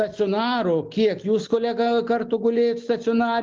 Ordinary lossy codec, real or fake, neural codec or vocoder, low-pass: Opus, 32 kbps; real; none; 7.2 kHz